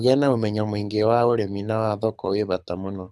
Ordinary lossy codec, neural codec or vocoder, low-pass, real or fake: none; codec, 24 kHz, 6 kbps, HILCodec; none; fake